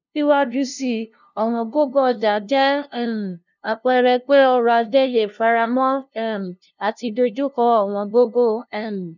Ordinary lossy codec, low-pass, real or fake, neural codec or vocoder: none; 7.2 kHz; fake; codec, 16 kHz, 0.5 kbps, FunCodec, trained on LibriTTS, 25 frames a second